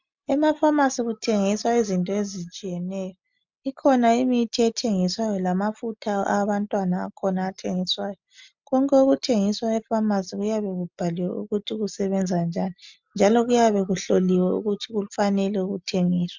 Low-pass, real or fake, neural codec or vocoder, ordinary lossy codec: 7.2 kHz; real; none; MP3, 64 kbps